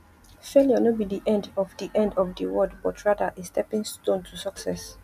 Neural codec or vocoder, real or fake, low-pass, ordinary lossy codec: none; real; 14.4 kHz; none